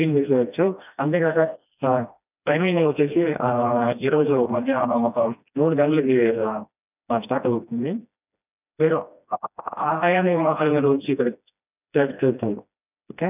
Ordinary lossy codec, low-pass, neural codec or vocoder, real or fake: none; 3.6 kHz; codec, 16 kHz, 1 kbps, FreqCodec, smaller model; fake